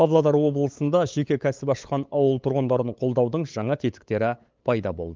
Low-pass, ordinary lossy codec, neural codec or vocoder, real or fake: 7.2 kHz; Opus, 32 kbps; codec, 16 kHz, 8 kbps, FunCodec, trained on LibriTTS, 25 frames a second; fake